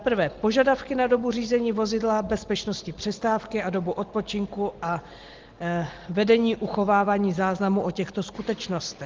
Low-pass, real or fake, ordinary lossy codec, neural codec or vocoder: 7.2 kHz; real; Opus, 16 kbps; none